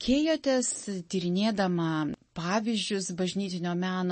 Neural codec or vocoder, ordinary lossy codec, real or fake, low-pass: none; MP3, 32 kbps; real; 10.8 kHz